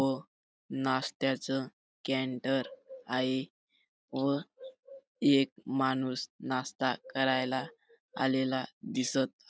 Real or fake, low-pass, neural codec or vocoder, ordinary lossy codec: real; none; none; none